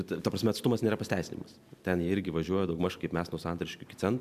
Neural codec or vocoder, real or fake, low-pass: none; real; 14.4 kHz